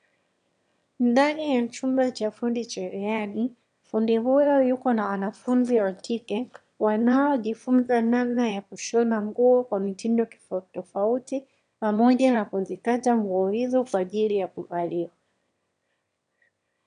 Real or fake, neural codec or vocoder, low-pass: fake; autoencoder, 22.05 kHz, a latent of 192 numbers a frame, VITS, trained on one speaker; 9.9 kHz